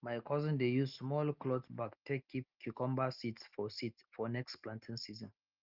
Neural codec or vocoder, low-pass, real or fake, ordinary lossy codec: none; 5.4 kHz; real; Opus, 24 kbps